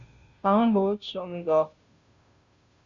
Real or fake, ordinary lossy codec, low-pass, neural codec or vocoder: fake; Opus, 64 kbps; 7.2 kHz; codec, 16 kHz, 0.5 kbps, FunCodec, trained on Chinese and English, 25 frames a second